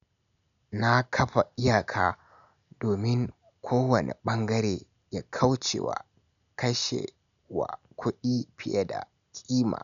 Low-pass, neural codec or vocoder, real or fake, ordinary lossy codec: 7.2 kHz; none; real; none